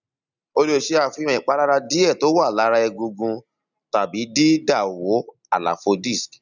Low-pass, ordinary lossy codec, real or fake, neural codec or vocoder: 7.2 kHz; none; real; none